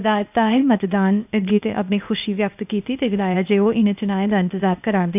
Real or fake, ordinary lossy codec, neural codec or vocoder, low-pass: fake; none; codec, 16 kHz, 0.8 kbps, ZipCodec; 3.6 kHz